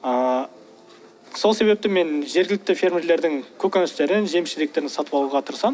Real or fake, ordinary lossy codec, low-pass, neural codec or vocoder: real; none; none; none